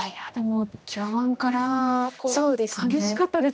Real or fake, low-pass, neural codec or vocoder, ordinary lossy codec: fake; none; codec, 16 kHz, 1 kbps, X-Codec, HuBERT features, trained on general audio; none